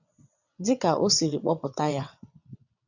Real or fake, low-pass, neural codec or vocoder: fake; 7.2 kHz; vocoder, 22.05 kHz, 80 mel bands, WaveNeXt